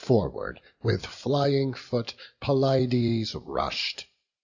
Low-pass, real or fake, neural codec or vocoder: 7.2 kHz; real; none